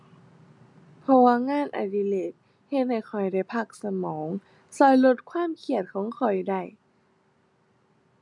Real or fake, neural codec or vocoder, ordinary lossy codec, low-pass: real; none; none; 10.8 kHz